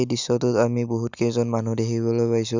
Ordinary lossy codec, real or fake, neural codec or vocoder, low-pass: none; real; none; 7.2 kHz